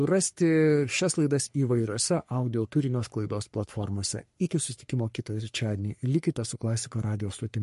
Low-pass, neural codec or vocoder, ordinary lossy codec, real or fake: 14.4 kHz; codec, 44.1 kHz, 3.4 kbps, Pupu-Codec; MP3, 48 kbps; fake